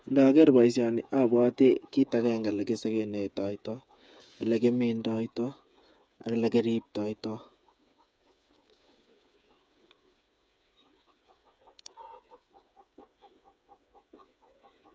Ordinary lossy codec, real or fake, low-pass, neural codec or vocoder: none; fake; none; codec, 16 kHz, 8 kbps, FreqCodec, smaller model